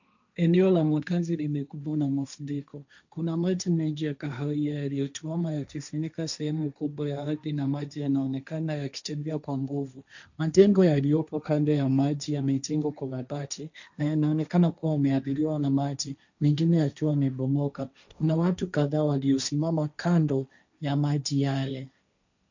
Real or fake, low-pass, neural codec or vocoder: fake; 7.2 kHz; codec, 16 kHz, 1.1 kbps, Voila-Tokenizer